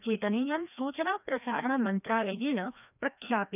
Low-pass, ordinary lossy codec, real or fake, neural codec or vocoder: 3.6 kHz; none; fake; codec, 16 kHz, 1 kbps, FreqCodec, larger model